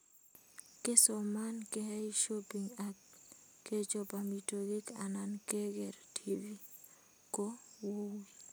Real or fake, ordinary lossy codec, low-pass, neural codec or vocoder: real; none; none; none